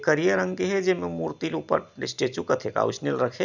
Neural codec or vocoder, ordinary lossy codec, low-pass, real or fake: none; none; 7.2 kHz; real